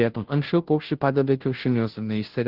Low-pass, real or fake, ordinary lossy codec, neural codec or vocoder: 5.4 kHz; fake; Opus, 16 kbps; codec, 16 kHz, 0.5 kbps, FunCodec, trained on Chinese and English, 25 frames a second